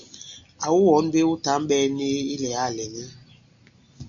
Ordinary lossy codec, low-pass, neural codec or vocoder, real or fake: Opus, 64 kbps; 7.2 kHz; none; real